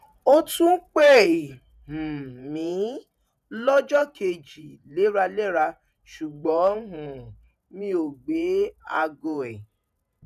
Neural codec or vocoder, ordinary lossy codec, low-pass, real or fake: vocoder, 48 kHz, 128 mel bands, Vocos; none; 14.4 kHz; fake